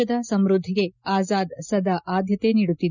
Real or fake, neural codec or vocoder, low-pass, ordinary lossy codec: real; none; 7.2 kHz; none